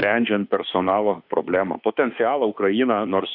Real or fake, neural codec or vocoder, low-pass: fake; autoencoder, 48 kHz, 32 numbers a frame, DAC-VAE, trained on Japanese speech; 5.4 kHz